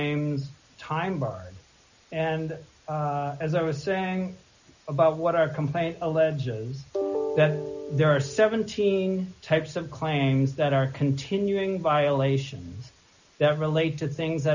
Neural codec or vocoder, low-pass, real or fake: none; 7.2 kHz; real